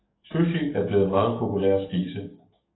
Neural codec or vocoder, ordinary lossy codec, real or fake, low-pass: codec, 44.1 kHz, 7.8 kbps, DAC; AAC, 16 kbps; fake; 7.2 kHz